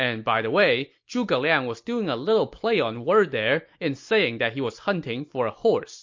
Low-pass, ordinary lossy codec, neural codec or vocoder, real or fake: 7.2 kHz; MP3, 48 kbps; none; real